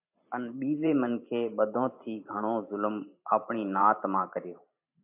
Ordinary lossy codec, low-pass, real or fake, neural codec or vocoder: MP3, 32 kbps; 3.6 kHz; fake; vocoder, 44.1 kHz, 128 mel bands every 512 samples, BigVGAN v2